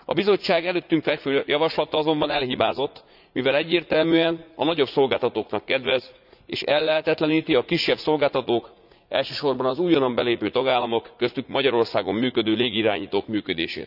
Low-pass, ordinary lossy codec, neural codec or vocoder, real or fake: 5.4 kHz; none; vocoder, 44.1 kHz, 80 mel bands, Vocos; fake